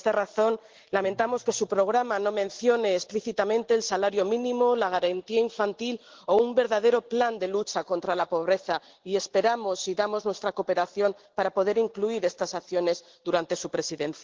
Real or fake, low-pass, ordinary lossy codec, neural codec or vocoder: real; 7.2 kHz; Opus, 16 kbps; none